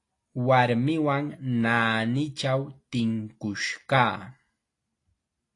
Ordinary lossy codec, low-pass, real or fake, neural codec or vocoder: AAC, 48 kbps; 10.8 kHz; real; none